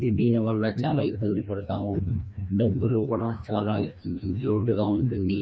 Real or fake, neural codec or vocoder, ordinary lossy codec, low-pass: fake; codec, 16 kHz, 1 kbps, FreqCodec, larger model; none; none